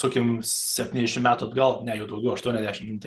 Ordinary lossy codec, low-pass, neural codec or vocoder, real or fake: Opus, 16 kbps; 14.4 kHz; none; real